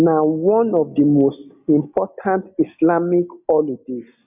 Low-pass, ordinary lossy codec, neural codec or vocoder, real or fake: 3.6 kHz; none; none; real